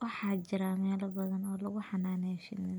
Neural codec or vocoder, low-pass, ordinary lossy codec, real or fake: none; none; none; real